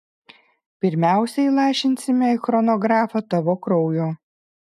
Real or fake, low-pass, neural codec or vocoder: real; 14.4 kHz; none